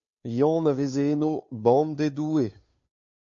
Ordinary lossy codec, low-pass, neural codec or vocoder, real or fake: MP3, 48 kbps; 7.2 kHz; codec, 16 kHz, 2 kbps, FunCodec, trained on Chinese and English, 25 frames a second; fake